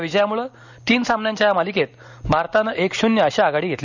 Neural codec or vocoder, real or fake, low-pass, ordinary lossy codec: none; real; 7.2 kHz; none